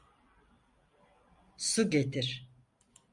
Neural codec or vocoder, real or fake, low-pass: none; real; 10.8 kHz